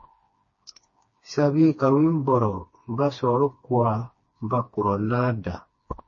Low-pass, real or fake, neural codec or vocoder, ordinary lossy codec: 7.2 kHz; fake; codec, 16 kHz, 2 kbps, FreqCodec, smaller model; MP3, 32 kbps